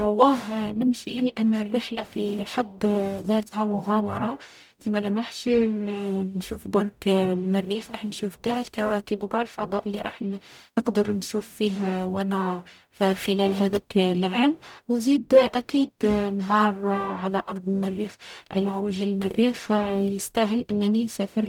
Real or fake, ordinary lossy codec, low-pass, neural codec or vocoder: fake; none; 19.8 kHz; codec, 44.1 kHz, 0.9 kbps, DAC